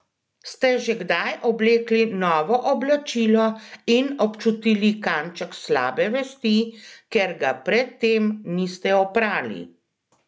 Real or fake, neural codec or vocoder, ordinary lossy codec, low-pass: real; none; none; none